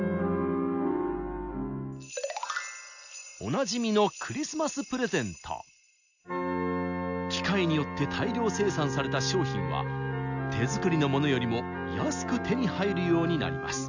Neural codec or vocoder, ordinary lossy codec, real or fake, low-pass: none; none; real; 7.2 kHz